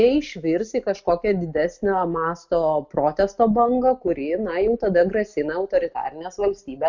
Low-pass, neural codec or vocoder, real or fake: 7.2 kHz; none; real